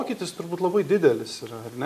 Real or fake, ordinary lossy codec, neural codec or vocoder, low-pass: real; MP3, 96 kbps; none; 14.4 kHz